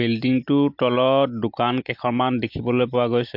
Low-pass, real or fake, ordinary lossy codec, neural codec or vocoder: 5.4 kHz; real; MP3, 48 kbps; none